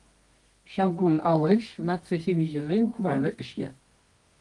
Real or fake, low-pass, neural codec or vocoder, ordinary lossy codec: fake; 10.8 kHz; codec, 24 kHz, 0.9 kbps, WavTokenizer, medium music audio release; Opus, 24 kbps